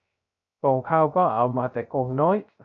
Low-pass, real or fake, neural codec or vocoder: 7.2 kHz; fake; codec, 16 kHz, 0.3 kbps, FocalCodec